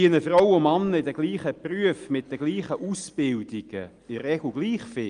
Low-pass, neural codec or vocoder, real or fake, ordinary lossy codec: 9.9 kHz; none; real; Opus, 32 kbps